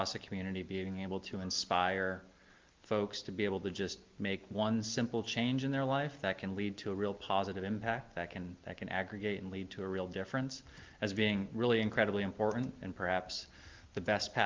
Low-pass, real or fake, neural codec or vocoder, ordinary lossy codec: 7.2 kHz; real; none; Opus, 32 kbps